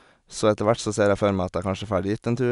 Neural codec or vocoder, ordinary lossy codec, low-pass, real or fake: none; none; 10.8 kHz; real